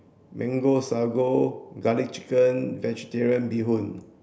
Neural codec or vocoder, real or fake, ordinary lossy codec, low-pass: none; real; none; none